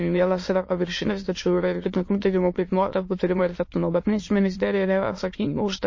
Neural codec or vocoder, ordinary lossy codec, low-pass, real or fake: autoencoder, 22.05 kHz, a latent of 192 numbers a frame, VITS, trained on many speakers; MP3, 32 kbps; 7.2 kHz; fake